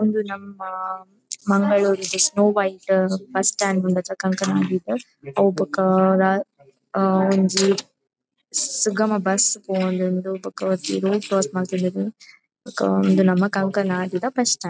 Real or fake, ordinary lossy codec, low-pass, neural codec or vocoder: real; none; none; none